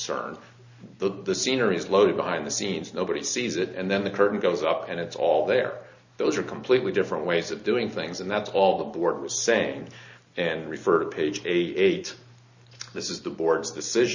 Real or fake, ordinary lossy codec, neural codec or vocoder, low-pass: real; Opus, 64 kbps; none; 7.2 kHz